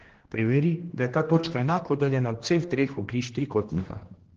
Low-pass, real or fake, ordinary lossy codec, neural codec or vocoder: 7.2 kHz; fake; Opus, 16 kbps; codec, 16 kHz, 1 kbps, X-Codec, HuBERT features, trained on general audio